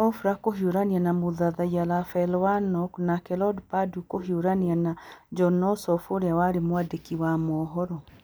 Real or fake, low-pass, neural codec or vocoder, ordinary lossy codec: real; none; none; none